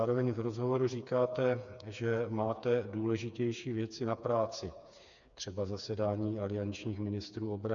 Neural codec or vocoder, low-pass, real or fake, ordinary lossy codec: codec, 16 kHz, 4 kbps, FreqCodec, smaller model; 7.2 kHz; fake; MP3, 96 kbps